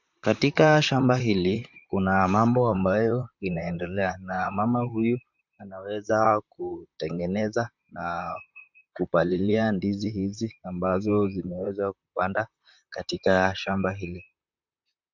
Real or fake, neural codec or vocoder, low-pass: fake; vocoder, 44.1 kHz, 128 mel bands, Pupu-Vocoder; 7.2 kHz